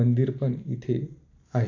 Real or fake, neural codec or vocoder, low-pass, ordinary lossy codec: real; none; 7.2 kHz; AAC, 48 kbps